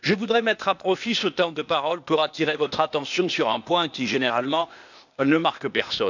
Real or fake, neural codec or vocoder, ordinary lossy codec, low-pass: fake; codec, 16 kHz, 0.8 kbps, ZipCodec; none; 7.2 kHz